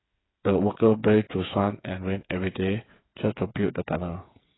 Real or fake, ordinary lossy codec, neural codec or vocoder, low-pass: fake; AAC, 16 kbps; codec, 16 kHz, 8 kbps, FreqCodec, smaller model; 7.2 kHz